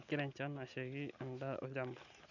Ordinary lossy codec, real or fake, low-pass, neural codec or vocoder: none; real; 7.2 kHz; none